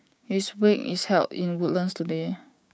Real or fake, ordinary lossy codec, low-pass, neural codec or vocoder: fake; none; none; codec, 16 kHz, 6 kbps, DAC